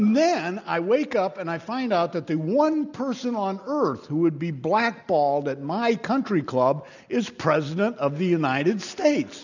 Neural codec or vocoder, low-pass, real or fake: none; 7.2 kHz; real